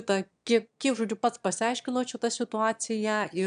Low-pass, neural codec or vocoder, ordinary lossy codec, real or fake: 9.9 kHz; autoencoder, 22.05 kHz, a latent of 192 numbers a frame, VITS, trained on one speaker; AAC, 96 kbps; fake